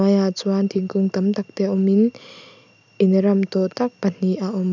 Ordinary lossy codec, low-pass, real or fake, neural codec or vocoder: none; 7.2 kHz; real; none